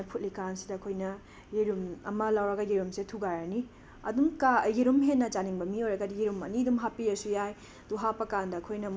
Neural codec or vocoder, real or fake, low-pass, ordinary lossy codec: none; real; none; none